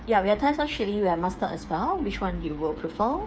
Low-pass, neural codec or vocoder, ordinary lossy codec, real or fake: none; codec, 16 kHz, 8 kbps, FreqCodec, smaller model; none; fake